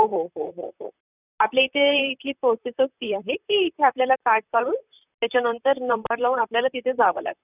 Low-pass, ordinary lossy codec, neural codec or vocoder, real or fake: 3.6 kHz; none; vocoder, 44.1 kHz, 128 mel bands, Pupu-Vocoder; fake